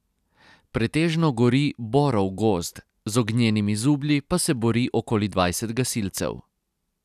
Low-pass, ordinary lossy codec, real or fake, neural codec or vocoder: 14.4 kHz; none; real; none